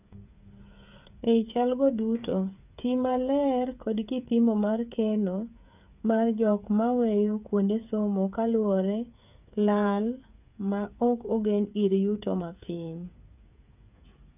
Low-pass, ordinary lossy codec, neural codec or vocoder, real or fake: 3.6 kHz; none; codec, 16 kHz, 8 kbps, FreqCodec, smaller model; fake